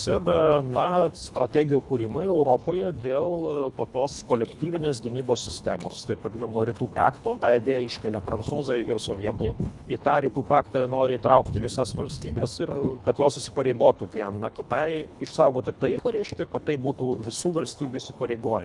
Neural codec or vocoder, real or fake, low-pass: codec, 24 kHz, 1.5 kbps, HILCodec; fake; 10.8 kHz